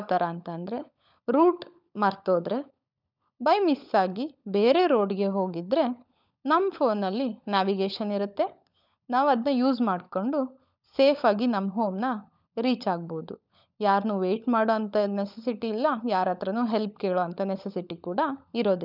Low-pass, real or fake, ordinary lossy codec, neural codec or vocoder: 5.4 kHz; fake; none; codec, 16 kHz, 16 kbps, FunCodec, trained on LibriTTS, 50 frames a second